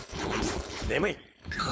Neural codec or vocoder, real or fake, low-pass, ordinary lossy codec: codec, 16 kHz, 4.8 kbps, FACodec; fake; none; none